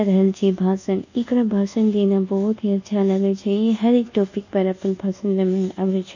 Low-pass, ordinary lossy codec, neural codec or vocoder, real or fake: 7.2 kHz; none; codec, 24 kHz, 1.2 kbps, DualCodec; fake